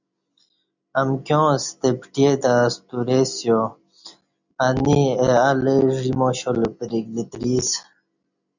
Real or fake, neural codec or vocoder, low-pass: real; none; 7.2 kHz